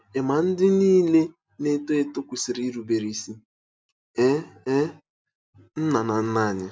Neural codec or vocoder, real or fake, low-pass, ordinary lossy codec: none; real; none; none